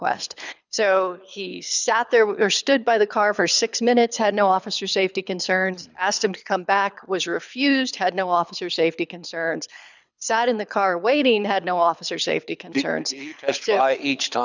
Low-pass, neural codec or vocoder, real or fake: 7.2 kHz; codec, 24 kHz, 6 kbps, HILCodec; fake